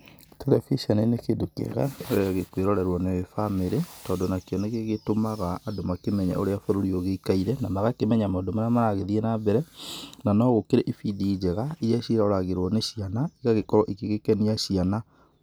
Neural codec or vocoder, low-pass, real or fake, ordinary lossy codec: none; none; real; none